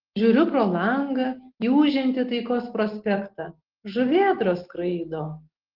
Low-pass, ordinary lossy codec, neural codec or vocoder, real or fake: 5.4 kHz; Opus, 16 kbps; none; real